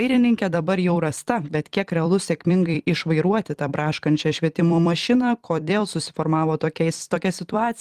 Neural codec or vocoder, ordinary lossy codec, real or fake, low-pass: vocoder, 44.1 kHz, 128 mel bands every 256 samples, BigVGAN v2; Opus, 24 kbps; fake; 14.4 kHz